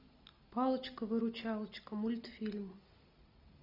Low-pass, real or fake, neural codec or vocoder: 5.4 kHz; real; none